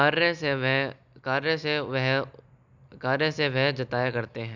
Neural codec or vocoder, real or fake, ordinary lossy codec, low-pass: none; real; none; 7.2 kHz